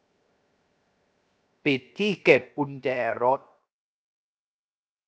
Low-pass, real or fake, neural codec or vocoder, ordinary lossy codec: none; fake; codec, 16 kHz, 0.7 kbps, FocalCodec; none